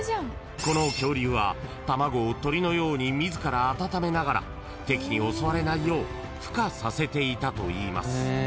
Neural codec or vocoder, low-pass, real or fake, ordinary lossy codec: none; none; real; none